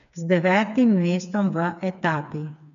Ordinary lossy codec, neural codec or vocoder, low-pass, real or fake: none; codec, 16 kHz, 4 kbps, FreqCodec, smaller model; 7.2 kHz; fake